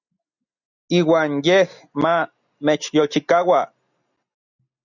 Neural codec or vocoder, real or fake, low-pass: none; real; 7.2 kHz